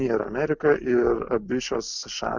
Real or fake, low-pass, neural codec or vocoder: real; 7.2 kHz; none